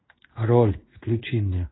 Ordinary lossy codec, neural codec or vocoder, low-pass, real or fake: AAC, 16 kbps; codec, 16 kHz in and 24 kHz out, 1 kbps, XY-Tokenizer; 7.2 kHz; fake